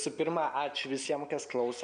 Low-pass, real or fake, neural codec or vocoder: 9.9 kHz; real; none